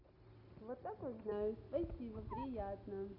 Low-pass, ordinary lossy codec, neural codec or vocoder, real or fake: 5.4 kHz; none; none; real